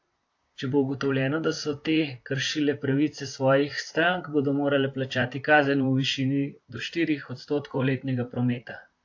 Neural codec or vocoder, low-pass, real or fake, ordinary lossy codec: vocoder, 44.1 kHz, 128 mel bands, Pupu-Vocoder; 7.2 kHz; fake; AAC, 48 kbps